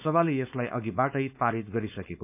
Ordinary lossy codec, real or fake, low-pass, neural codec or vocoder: none; fake; 3.6 kHz; codec, 16 kHz, 4.8 kbps, FACodec